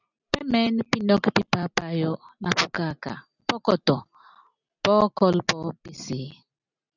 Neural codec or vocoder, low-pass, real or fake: none; 7.2 kHz; real